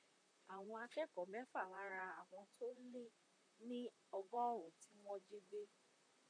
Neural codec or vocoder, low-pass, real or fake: vocoder, 44.1 kHz, 128 mel bands, Pupu-Vocoder; 9.9 kHz; fake